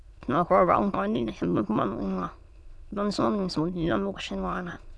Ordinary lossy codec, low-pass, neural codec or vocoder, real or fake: none; none; autoencoder, 22.05 kHz, a latent of 192 numbers a frame, VITS, trained on many speakers; fake